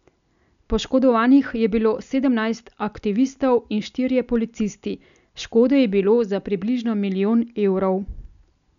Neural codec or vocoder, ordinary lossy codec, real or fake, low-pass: none; none; real; 7.2 kHz